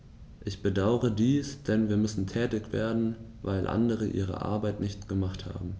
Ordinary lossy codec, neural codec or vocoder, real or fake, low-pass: none; none; real; none